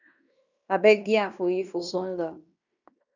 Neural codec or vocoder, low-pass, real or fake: codec, 16 kHz in and 24 kHz out, 0.9 kbps, LongCat-Audio-Codec, fine tuned four codebook decoder; 7.2 kHz; fake